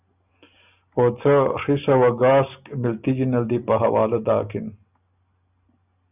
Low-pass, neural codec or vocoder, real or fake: 3.6 kHz; none; real